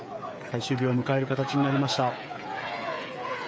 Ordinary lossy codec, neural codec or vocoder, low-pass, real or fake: none; codec, 16 kHz, 8 kbps, FreqCodec, smaller model; none; fake